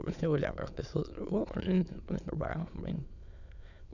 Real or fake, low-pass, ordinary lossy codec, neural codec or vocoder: fake; 7.2 kHz; none; autoencoder, 22.05 kHz, a latent of 192 numbers a frame, VITS, trained on many speakers